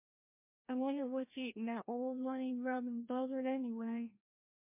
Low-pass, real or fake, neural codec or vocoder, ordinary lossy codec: 3.6 kHz; fake; codec, 16 kHz, 1 kbps, FreqCodec, larger model; MP3, 24 kbps